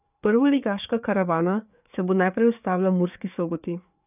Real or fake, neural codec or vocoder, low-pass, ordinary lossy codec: fake; codec, 16 kHz, 4 kbps, FreqCodec, larger model; 3.6 kHz; none